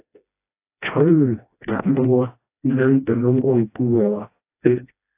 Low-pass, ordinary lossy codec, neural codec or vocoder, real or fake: 3.6 kHz; AAC, 24 kbps; codec, 16 kHz, 1 kbps, FreqCodec, smaller model; fake